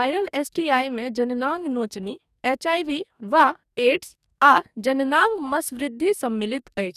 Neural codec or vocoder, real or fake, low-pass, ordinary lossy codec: codec, 44.1 kHz, 2.6 kbps, DAC; fake; 14.4 kHz; none